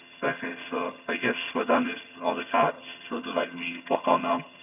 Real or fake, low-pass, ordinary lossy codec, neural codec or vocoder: fake; 3.6 kHz; none; vocoder, 22.05 kHz, 80 mel bands, HiFi-GAN